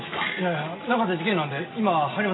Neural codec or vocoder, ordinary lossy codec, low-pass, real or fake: none; AAC, 16 kbps; 7.2 kHz; real